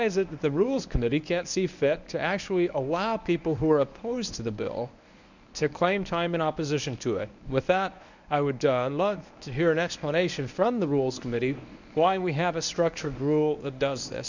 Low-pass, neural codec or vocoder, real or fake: 7.2 kHz; codec, 24 kHz, 0.9 kbps, WavTokenizer, medium speech release version 1; fake